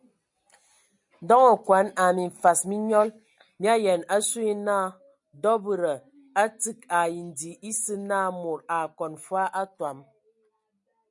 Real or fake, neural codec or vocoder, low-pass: real; none; 10.8 kHz